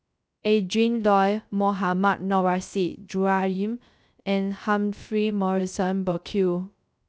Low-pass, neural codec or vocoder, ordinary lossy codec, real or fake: none; codec, 16 kHz, 0.3 kbps, FocalCodec; none; fake